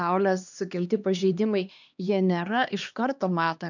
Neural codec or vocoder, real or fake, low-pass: codec, 16 kHz, 2 kbps, X-Codec, HuBERT features, trained on LibriSpeech; fake; 7.2 kHz